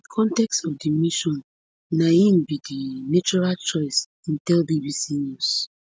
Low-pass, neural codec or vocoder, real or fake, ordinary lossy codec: none; none; real; none